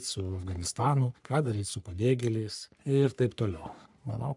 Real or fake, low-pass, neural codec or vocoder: fake; 10.8 kHz; codec, 44.1 kHz, 3.4 kbps, Pupu-Codec